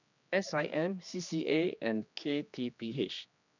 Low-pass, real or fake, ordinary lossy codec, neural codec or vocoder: 7.2 kHz; fake; none; codec, 16 kHz, 2 kbps, X-Codec, HuBERT features, trained on general audio